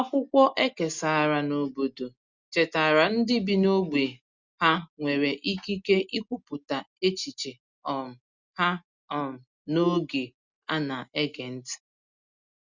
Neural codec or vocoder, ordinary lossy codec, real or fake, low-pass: none; Opus, 64 kbps; real; 7.2 kHz